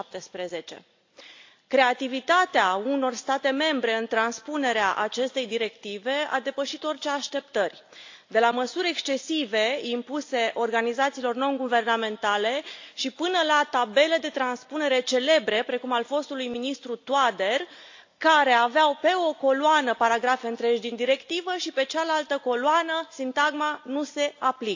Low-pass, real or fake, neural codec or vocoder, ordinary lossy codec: 7.2 kHz; real; none; AAC, 48 kbps